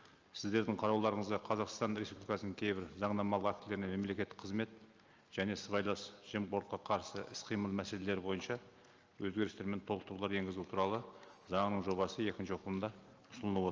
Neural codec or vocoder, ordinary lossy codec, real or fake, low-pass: none; Opus, 24 kbps; real; 7.2 kHz